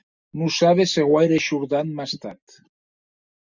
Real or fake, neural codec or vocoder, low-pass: real; none; 7.2 kHz